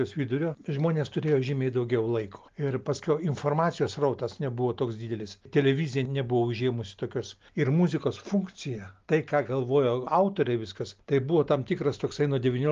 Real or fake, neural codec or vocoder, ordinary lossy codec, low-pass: real; none; Opus, 32 kbps; 7.2 kHz